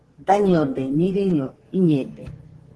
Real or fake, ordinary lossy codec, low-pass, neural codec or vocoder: fake; Opus, 16 kbps; 10.8 kHz; codec, 44.1 kHz, 2.6 kbps, SNAC